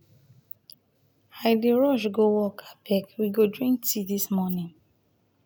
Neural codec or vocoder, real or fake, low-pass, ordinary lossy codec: none; real; none; none